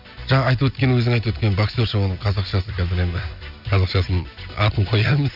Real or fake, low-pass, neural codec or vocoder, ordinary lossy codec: real; 5.4 kHz; none; none